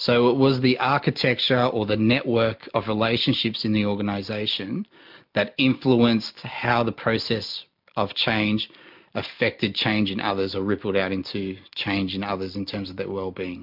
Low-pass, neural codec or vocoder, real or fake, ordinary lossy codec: 5.4 kHz; none; real; MP3, 48 kbps